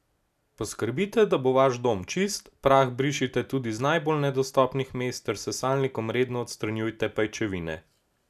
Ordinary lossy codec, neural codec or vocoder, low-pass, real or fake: none; none; 14.4 kHz; real